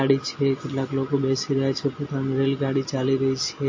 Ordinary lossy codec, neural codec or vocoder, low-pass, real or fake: MP3, 32 kbps; none; 7.2 kHz; real